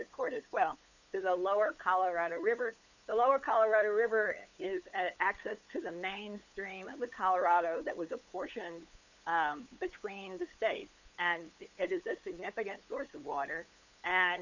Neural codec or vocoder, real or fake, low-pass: codec, 16 kHz, 8 kbps, FunCodec, trained on LibriTTS, 25 frames a second; fake; 7.2 kHz